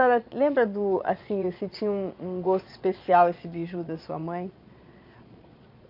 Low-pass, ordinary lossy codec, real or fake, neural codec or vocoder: 5.4 kHz; none; fake; vocoder, 22.05 kHz, 80 mel bands, Vocos